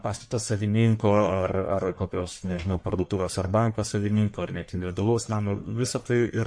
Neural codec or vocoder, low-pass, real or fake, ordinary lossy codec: codec, 44.1 kHz, 1.7 kbps, Pupu-Codec; 9.9 kHz; fake; MP3, 48 kbps